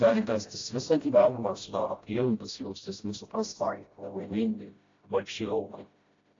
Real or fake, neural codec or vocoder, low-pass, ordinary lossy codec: fake; codec, 16 kHz, 0.5 kbps, FreqCodec, smaller model; 7.2 kHz; AAC, 32 kbps